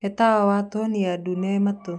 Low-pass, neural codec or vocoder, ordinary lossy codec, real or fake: none; none; none; real